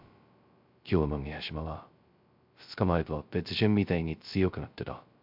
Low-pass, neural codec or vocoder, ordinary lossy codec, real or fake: 5.4 kHz; codec, 16 kHz, 0.2 kbps, FocalCodec; MP3, 48 kbps; fake